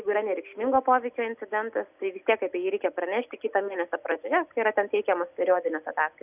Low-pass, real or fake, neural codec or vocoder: 3.6 kHz; real; none